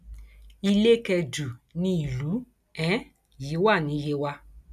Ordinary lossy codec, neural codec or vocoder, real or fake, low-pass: none; none; real; 14.4 kHz